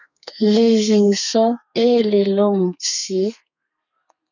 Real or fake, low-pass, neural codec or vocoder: fake; 7.2 kHz; codec, 32 kHz, 1.9 kbps, SNAC